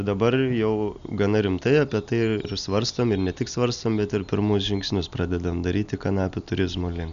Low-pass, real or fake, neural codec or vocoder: 7.2 kHz; real; none